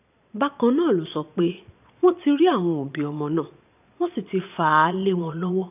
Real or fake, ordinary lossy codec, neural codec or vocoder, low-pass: fake; none; vocoder, 22.05 kHz, 80 mel bands, Vocos; 3.6 kHz